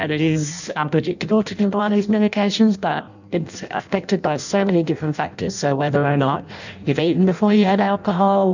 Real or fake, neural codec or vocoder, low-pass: fake; codec, 16 kHz in and 24 kHz out, 0.6 kbps, FireRedTTS-2 codec; 7.2 kHz